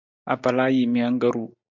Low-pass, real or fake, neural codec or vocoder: 7.2 kHz; real; none